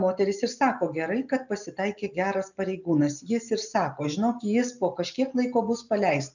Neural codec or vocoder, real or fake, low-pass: none; real; 7.2 kHz